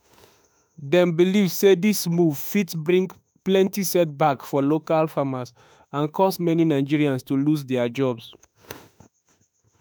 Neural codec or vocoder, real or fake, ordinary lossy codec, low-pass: autoencoder, 48 kHz, 32 numbers a frame, DAC-VAE, trained on Japanese speech; fake; none; none